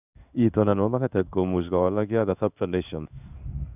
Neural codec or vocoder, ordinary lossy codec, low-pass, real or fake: codec, 16 kHz in and 24 kHz out, 1 kbps, XY-Tokenizer; none; 3.6 kHz; fake